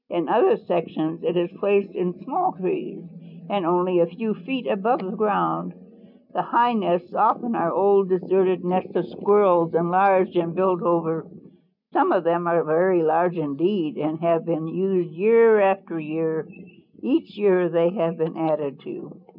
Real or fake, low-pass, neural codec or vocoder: real; 5.4 kHz; none